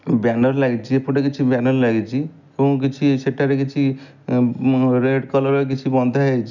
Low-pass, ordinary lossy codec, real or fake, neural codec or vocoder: 7.2 kHz; none; real; none